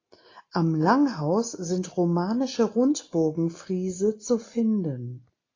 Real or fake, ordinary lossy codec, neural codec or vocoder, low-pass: real; AAC, 32 kbps; none; 7.2 kHz